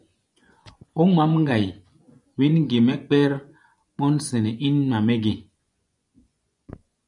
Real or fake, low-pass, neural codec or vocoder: fake; 10.8 kHz; vocoder, 24 kHz, 100 mel bands, Vocos